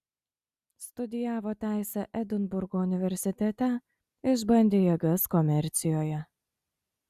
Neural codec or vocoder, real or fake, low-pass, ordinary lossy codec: none; real; 14.4 kHz; Opus, 64 kbps